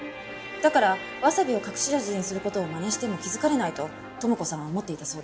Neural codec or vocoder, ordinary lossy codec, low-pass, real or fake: none; none; none; real